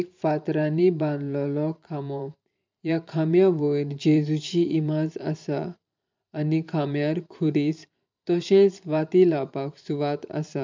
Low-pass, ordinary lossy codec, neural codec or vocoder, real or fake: 7.2 kHz; MP3, 64 kbps; vocoder, 44.1 kHz, 128 mel bands every 512 samples, BigVGAN v2; fake